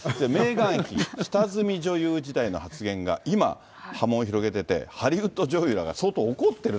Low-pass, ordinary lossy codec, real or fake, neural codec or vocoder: none; none; real; none